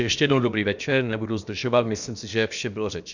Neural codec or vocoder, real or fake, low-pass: codec, 16 kHz, about 1 kbps, DyCAST, with the encoder's durations; fake; 7.2 kHz